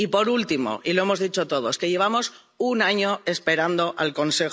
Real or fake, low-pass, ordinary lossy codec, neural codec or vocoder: real; none; none; none